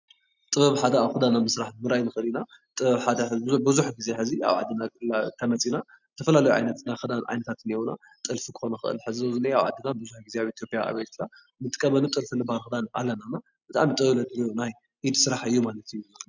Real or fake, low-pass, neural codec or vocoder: real; 7.2 kHz; none